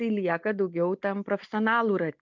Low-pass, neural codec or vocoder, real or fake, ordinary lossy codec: 7.2 kHz; none; real; MP3, 64 kbps